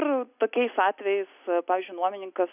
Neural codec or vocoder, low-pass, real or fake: none; 3.6 kHz; real